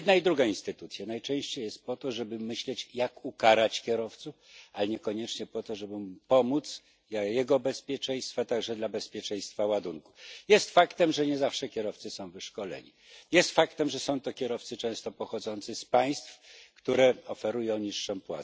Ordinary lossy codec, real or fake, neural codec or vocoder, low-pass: none; real; none; none